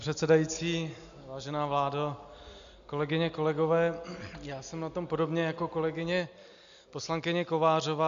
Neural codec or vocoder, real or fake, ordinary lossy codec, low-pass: none; real; AAC, 96 kbps; 7.2 kHz